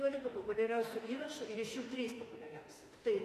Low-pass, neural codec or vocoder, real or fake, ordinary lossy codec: 14.4 kHz; autoencoder, 48 kHz, 32 numbers a frame, DAC-VAE, trained on Japanese speech; fake; AAC, 48 kbps